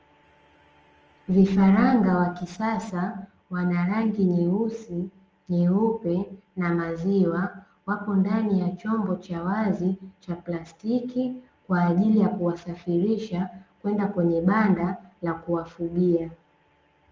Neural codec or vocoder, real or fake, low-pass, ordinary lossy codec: none; real; 7.2 kHz; Opus, 24 kbps